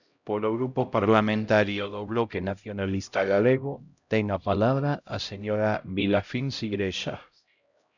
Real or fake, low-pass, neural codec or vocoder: fake; 7.2 kHz; codec, 16 kHz, 0.5 kbps, X-Codec, HuBERT features, trained on LibriSpeech